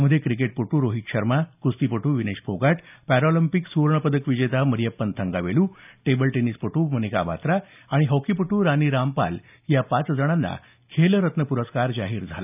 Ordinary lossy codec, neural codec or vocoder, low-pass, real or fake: none; none; 3.6 kHz; real